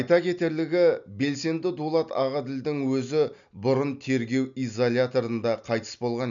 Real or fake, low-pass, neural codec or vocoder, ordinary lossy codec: real; 7.2 kHz; none; none